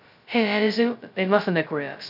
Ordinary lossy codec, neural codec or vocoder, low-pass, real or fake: none; codec, 16 kHz, 0.2 kbps, FocalCodec; 5.4 kHz; fake